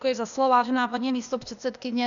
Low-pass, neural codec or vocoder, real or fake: 7.2 kHz; codec, 16 kHz, 0.8 kbps, ZipCodec; fake